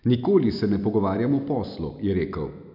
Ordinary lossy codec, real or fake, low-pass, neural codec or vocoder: none; real; 5.4 kHz; none